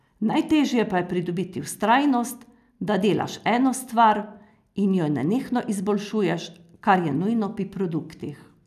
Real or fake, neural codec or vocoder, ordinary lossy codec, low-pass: real; none; none; 14.4 kHz